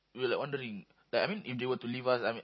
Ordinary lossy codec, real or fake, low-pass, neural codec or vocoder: MP3, 24 kbps; real; 7.2 kHz; none